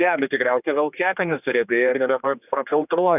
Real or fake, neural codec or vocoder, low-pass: fake; codec, 16 kHz, 2 kbps, X-Codec, HuBERT features, trained on general audio; 3.6 kHz